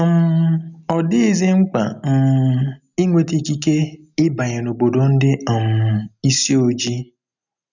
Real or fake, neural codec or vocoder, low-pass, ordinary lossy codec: real; none; 7.2 kHz; none